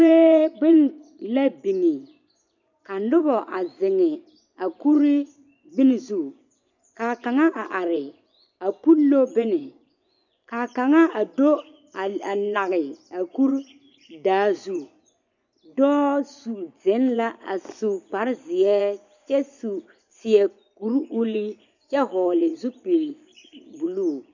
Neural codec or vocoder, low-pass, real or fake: vocoder, 24 kHz, 100 mel bands, Vocos; 7.2 kHz; fake